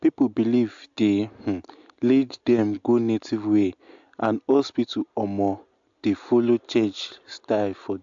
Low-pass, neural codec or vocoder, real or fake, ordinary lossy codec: 7.2 kHz; none; real; AAC, 64 kbps